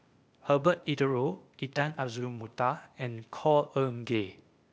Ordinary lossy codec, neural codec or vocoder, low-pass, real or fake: none; codec, 16 kHz, 0.8 kbps, ZipCodec; none; fake